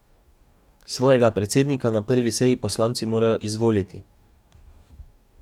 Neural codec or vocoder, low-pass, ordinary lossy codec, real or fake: codec, 44.1 kHz, 2.6 kbps, DAC; 19.8 kHz; none; fake